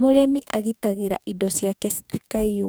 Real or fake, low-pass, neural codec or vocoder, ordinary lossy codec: fake; none; codec, 44.1 kHz, 2.6 kbps, DAC; none